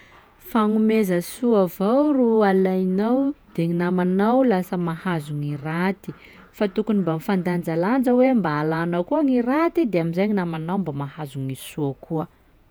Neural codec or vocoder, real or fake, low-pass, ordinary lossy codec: vocoder, 48 kHz, 128 mel bands, Vocos; fake; none; none